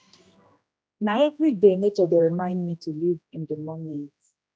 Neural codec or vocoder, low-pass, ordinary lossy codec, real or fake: codec, 16 kHz, 1 kbps, X-Codec, HuBERT features, trained on general audio; none; none; fake